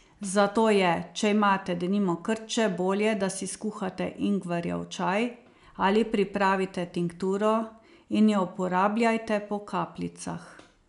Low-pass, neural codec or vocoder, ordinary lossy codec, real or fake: 10.8 kHz; none; none; real